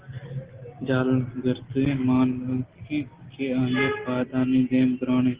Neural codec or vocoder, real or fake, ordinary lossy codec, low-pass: none; real; Opus, 16 kbps; 3.6 kHz